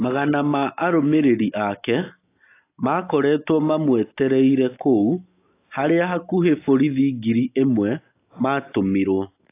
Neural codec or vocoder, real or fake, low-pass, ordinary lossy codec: none; real; 3.6 kHz; AAC, 24 kbps